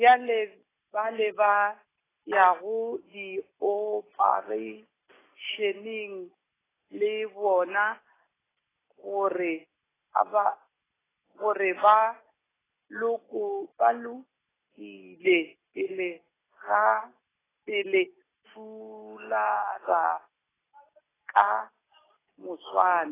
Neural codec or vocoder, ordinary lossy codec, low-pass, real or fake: none; AAC, 16 kbps; 3.6 kHz; real